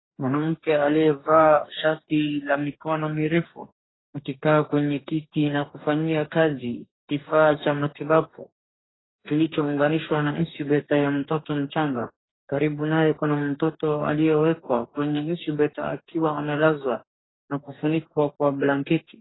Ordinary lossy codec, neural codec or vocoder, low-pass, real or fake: AAC, 16 kbps; codec, 44.1 kHz, 2.6 kbps, DAC; 7.2 kHz; fake